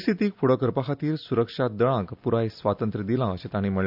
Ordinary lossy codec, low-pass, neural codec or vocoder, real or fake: AAC, 48 kbps; 5.4 kHz; none; real